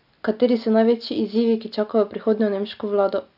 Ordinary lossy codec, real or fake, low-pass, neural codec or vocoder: none; real; 5.4 kHz; none